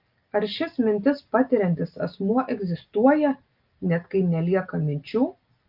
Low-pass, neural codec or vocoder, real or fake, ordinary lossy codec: 5.4 kHz; none; real; Opus, 24 kbps